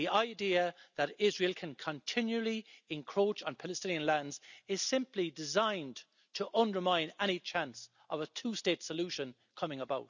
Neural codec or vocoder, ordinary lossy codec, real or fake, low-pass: none; none; real; 7.2 kHz